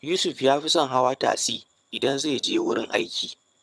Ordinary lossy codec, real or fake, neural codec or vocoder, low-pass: none; fake; vocoder, 22.05 kHz, 80 mel bands, HiFi-GAN; none